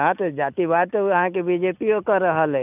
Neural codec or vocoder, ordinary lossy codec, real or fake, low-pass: none; none; real; 3.6 kHz